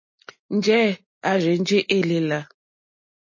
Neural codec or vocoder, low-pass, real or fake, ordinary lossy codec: none; 7.2 kHz; real; MP3, 32 kbps